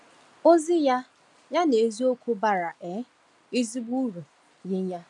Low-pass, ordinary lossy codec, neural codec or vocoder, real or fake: 10.8 kHz; none; none; real